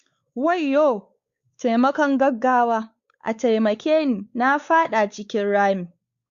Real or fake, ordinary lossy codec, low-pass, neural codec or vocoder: fake; Opus, 64 kbps; 7.2 kHz; codec, 16 kHz, 4 kbps, X-Codec, WavLM features, trained on Multilingual LibriSpeech